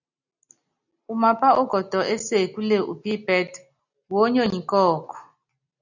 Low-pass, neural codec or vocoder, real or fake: 7.2 kHz; none; real